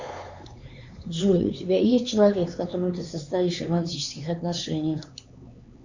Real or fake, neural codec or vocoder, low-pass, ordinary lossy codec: fake; codec, 16 kHz, 4 kbps, X-Codec, HuBERT features, trained on LibriSpeech; 7.2 kHz; Opus, 64 kbps